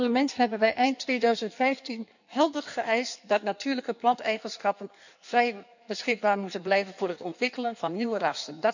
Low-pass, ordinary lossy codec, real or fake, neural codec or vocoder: 7.2 kHz; none; fake; codec, 16 kHz in and 24 kHz out, 1.1 kbps, FireRedTTS-2 codec